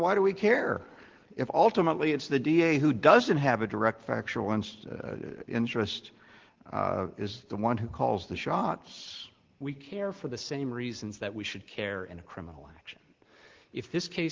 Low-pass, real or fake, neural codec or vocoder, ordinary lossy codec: 7.2 kHz; real; none; Opus, 16 kbps